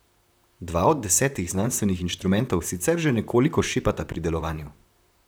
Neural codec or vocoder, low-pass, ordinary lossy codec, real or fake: vocoder, 44.1 kHz, 128 mel bands, Pupu-Vocoder; none; none; fake